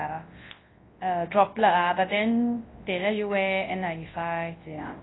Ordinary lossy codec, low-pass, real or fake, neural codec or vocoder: AAC, 16 kbps; 7.2 kHz; fake; codec, 24 kHz, 0.9 kbps, WavTokenizer, large speech release